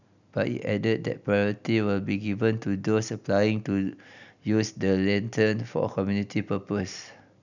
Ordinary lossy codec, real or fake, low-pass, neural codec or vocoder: none; real; 7.2 kHz; none